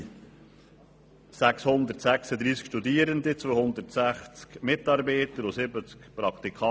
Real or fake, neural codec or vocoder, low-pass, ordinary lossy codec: real; none; none; none